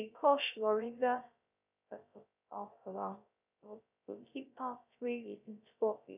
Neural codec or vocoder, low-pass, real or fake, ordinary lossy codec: codec, 16 kHz, 0.3 kbps, FocalCodec; 3.6 kHz; fake; none